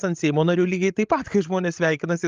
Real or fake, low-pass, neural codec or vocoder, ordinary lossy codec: fake; 7.2 kHz; codec, 16 kHz, 16 kbps, FreqCodec, larger model; Opus, 32 kbps